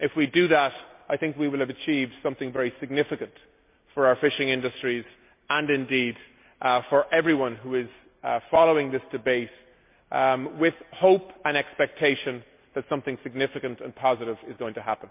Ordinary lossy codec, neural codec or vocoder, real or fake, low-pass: MP3, 32 kbps; none; real; 3.6 kHz